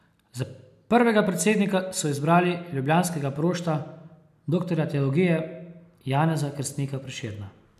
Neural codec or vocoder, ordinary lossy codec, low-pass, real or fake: none; none; 14.4 kHz; real